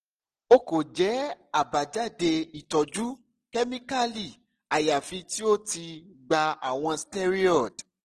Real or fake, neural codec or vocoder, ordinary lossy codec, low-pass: real; none; Opus, 16 kbps; 10.8 kHz